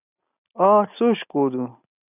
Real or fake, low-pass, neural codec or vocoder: real; 3.6 kHz; none